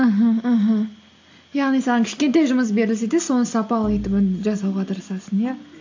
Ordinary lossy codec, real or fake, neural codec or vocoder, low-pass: AAC, 48 kbps; real; none; 7.2 kHz